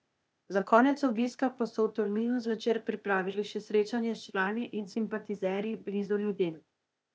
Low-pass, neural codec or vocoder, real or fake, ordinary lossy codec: none; codec, 16 kHz, 0.8 kbps, ZipCodec; fake; none